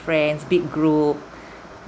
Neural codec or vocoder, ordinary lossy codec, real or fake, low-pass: none; none; real; none